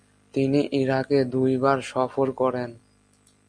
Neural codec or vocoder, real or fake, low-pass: none; real; 9.9 kHz